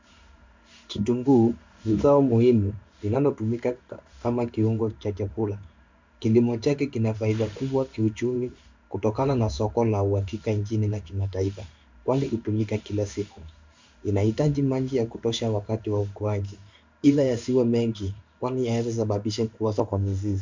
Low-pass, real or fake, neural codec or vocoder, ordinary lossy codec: 7.2 kHz; fake; codec, 16 kHz in and 24 kHz out, 1 kbps, XY-Tokenizer; MP3, 64 kbps